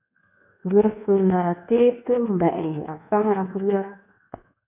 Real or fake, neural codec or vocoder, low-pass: fake; codec, 24 kHz, 1.2 kbps, DualCodec; 3.6 kHz